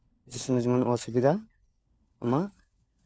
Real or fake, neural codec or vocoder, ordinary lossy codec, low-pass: fake; codec, 16 kHz, 4 kbps, FunCodec, trained on LibriTTS, 50 frames a second; none; none